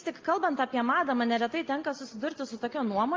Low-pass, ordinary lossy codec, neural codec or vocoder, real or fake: 7.2 kHz; Opus, 32 kbps; none; real